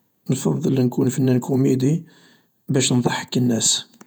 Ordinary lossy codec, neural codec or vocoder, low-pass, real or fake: none; vocoder, 48 kHz, 128 mel bands, Vocos; none; fake